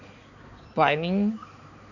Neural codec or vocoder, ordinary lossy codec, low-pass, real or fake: codec, 16 kHz, 4 kbps, X-Codec, HuBERT features, trained on balanced general audio; none; 7.2 kHz; fake